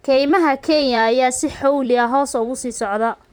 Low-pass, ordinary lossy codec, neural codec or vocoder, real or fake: none; none; vocoder, 44.1 kHz, 128 mel bands, Pupu-Vocoder; fake